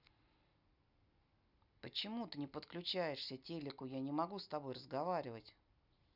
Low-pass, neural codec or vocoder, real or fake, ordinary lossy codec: 5.4 kHz; none; real; none